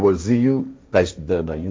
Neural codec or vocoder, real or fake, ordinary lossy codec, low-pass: codec, 16 kHz, 1.1 kbps, Voila-Tokenizer; fake; none; none